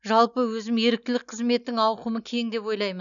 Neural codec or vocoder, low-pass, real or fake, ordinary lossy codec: none; 7.2 kHz; real; none